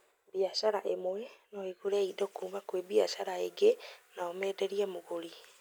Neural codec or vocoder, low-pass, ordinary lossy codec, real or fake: none; none; none; real